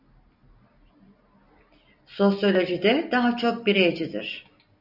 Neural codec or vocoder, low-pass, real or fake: none; 5.4 kHz; real